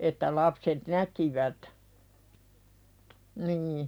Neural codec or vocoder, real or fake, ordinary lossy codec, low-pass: vocoder, 44.1 kHz, 128 mel bands every 512 samples, BigVGAN v2; fake; none; none